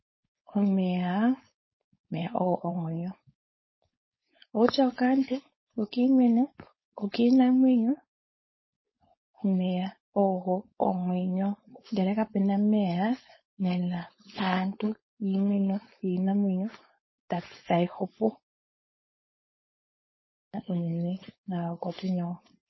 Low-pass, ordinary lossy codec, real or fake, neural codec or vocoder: 7.2 kHz; MP3, 24 kbps; fake; codec, 16 kHz, 4.8 kbps, FACodec